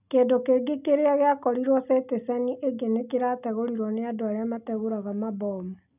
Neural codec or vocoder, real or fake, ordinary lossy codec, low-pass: none; real; none; 3.6 kHz